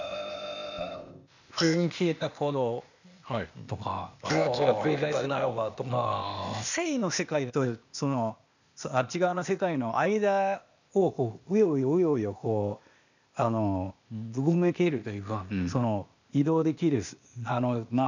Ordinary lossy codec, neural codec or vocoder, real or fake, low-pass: none; codec, 16 kHz, 0.8 kbps, ZipCodec; fake; 7.2 kHz